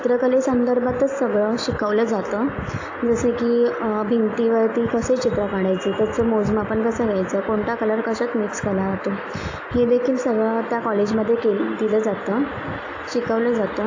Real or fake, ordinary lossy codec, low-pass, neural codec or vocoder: real; AAC, 48 kbps; 7.2 kHz; none